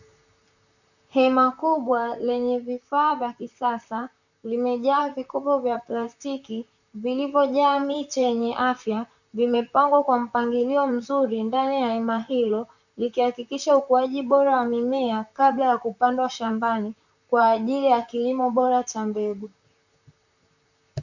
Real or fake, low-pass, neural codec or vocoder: fake; 7.2 kHz; vocoder, 44.1 kHz, 128 mel bands, Pupu-Vocoder